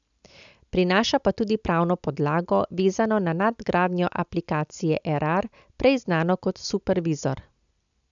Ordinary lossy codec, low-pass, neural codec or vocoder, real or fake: MP3, 96 kbps; 7.2 kHz; none; real